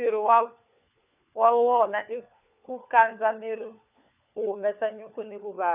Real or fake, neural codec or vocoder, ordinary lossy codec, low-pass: fake; codec, 16 kHz, 4 kbps, FunCodec, trained on LibriTTS, 50 frames a second; none; 3.6 kHz